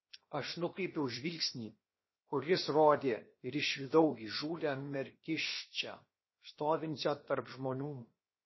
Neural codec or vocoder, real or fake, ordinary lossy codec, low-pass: codec, 16 kHz, 0.7 kbps, FocalCodec; fake; MP3, 24 kbps; 7.2 kHz